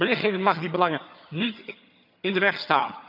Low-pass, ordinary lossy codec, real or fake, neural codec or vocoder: 5.4 kHz; none; fake; vocoder, 22.05 kHz, 80 mel bands, HiFi-GAN